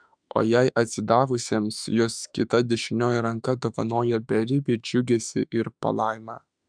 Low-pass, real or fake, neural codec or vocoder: 9.9 kHz; fake; autoencoder, 48 kHz, 32 numbers a frame, DAC-VAE, trained on Japanese speech